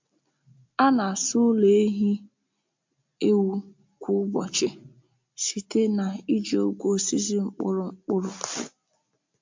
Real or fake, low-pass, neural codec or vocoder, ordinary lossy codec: real; 7.2 kHz; none; MP3, 64 kbps